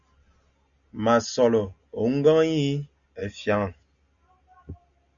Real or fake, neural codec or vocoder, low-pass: real; none; 7.2 kHz